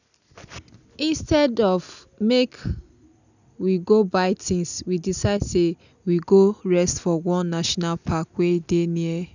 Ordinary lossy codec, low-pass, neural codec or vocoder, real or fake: none; 7.2 kHz; none; real